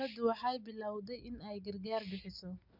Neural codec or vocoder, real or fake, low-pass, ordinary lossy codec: none; real; 5.4 kHz; MP3, 48 kbps